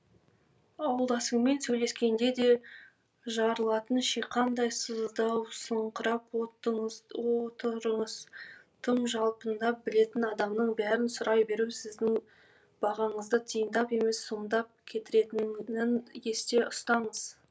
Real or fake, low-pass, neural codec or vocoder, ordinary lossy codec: real; none; none; none